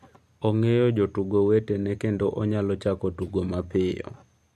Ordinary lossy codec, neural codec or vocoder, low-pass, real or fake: MP3, 64 kbps; none; 14.4 kHz; real